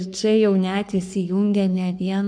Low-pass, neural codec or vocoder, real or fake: 9.9 kHz; codec, 44.1 kHz, 3.4 kbps, Pupu-Codec; fake